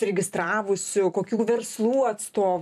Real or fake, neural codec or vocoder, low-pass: fake; vocoder, 44.1 kHz, 128 mel bands every 256 samples, BigVGAN v2; 14.4 kHz